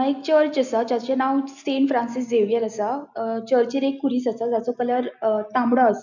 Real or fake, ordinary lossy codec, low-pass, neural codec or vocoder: real; none; 7.2 kHz; none